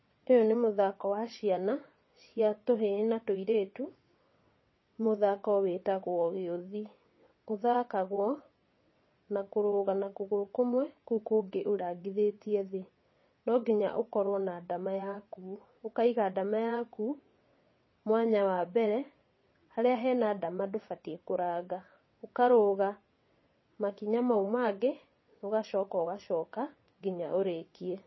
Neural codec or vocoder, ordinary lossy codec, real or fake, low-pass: vocoder, 22.05 kHz, 80 mel bands, WaveNeXt; MP3, 24 kbps; fake; 7.2 kHz